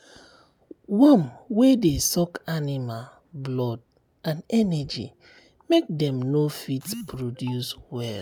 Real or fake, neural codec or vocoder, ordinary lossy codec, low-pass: real; none; none; none